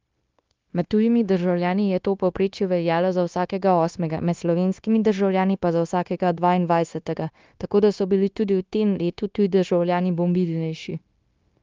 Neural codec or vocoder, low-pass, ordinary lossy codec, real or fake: codec, 16 kHz, 0.9 kbps, LongCat-Audio-Codec; 7.2 kHz; Opus, 32 kbps; fake